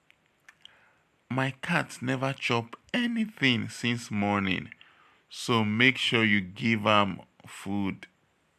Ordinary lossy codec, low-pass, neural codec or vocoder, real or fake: none; 14.4 kHz; none; real